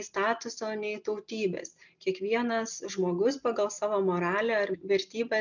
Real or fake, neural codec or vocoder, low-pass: real; none; 7.2 kHz